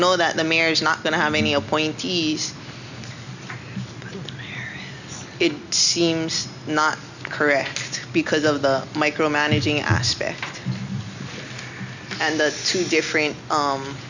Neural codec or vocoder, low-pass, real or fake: none; 7.2 kHz; real